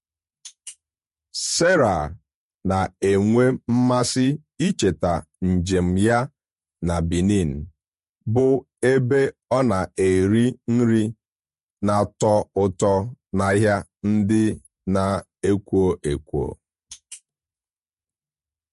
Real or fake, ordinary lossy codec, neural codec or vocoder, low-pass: fake; MP3, 48 kbps; vocoder, 48 kHz, 128 mel bands, Vocos; 14.4 kHz